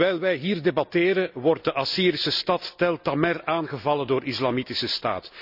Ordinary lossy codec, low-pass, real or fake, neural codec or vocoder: none; 5.4 kHz; real; none